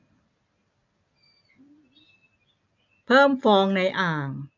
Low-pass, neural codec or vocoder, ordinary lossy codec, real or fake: 7.2 kHz; none; none; real